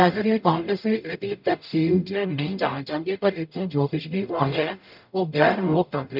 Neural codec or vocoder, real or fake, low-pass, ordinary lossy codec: codec, 44.1 kHz, 0.9 kbps, DAC; fake; 5.4 kHz; none